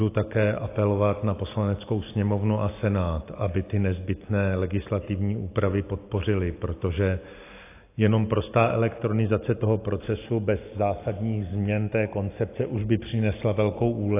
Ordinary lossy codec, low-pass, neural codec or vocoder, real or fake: AAC, 24 kbps; 3.6 kHz; none; real